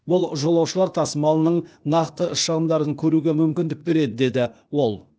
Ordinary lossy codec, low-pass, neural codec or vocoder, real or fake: none; none; codec, 16 kHz, 0.8 kbps, ZipCodec; fake